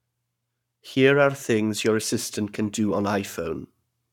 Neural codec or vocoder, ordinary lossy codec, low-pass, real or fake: codec, 44.1 kHz, 7.8 kbps, Pupu-Codec; none; 19.8 kHz; fake